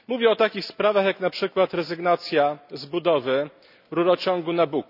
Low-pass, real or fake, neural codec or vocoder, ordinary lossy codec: 5.4 kHz; real; none; none